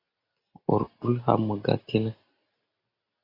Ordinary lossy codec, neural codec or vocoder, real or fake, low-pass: AAC, 24 kbps; none; real; 5.4 kHz